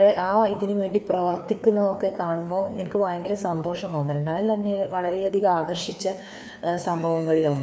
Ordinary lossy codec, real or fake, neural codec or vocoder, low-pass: none; fake; codec, 16 kHz, 2 kbps, FreqCodec, larger model; none